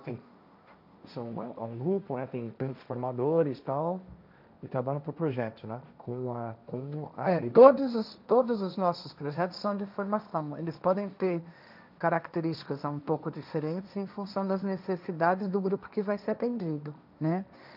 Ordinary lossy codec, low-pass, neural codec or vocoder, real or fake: none; 5.4 kHz; codec, 16 kHz, 1.1 kbps, Voila-Tokenizer; fake